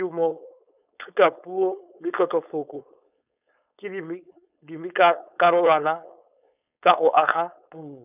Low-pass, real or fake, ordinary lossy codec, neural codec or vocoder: 3.6 kHz; fake; none; codec, 16 kHz, 4.8 kbps, FACodec